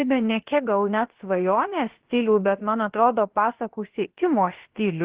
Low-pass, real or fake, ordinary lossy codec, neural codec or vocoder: 3.6 kHz; fake; Opus, 16 kbps; codec, 16 kHz, about 1 kbps, DyCAST, with the encoder's durations